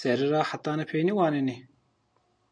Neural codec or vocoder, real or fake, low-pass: none; real; 9.9 kHz